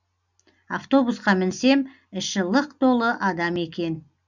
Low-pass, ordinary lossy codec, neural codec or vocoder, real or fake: 7.2 kHz; none; none; real